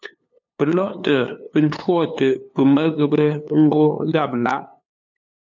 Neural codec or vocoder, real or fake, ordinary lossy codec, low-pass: codec, 16 kHz, 2 kbps, FunCodec, trained on LibriTTS, 25 frames a second; fake; MP3, 64 kbps; 7.2 kHz